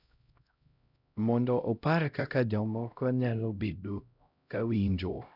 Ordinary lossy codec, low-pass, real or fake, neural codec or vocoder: none; 5.4 kHz; fake; codec, 16 kHz, 0.5 kbps, X-Codec, HuBERT features, trained on LibriSpeech